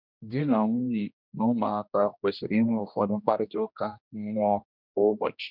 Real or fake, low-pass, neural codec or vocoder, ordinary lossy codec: fake; 5.4 kHz; codec, 16 kHz, 1 kbps, X-Codec, HuBERT features, trained on general audio; none